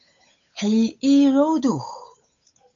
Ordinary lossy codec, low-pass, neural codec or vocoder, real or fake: AAC, 48 kbps; 7.2 kHz; codec, 16 kHz, 8 kbps, FunCodec, trained on Chinese and English, 25 frames a second; fake